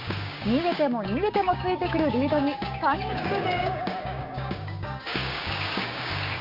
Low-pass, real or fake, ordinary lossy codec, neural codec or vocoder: 5.4 kHz; fake; none; codec, 16 kHz, 6 kbps, DAC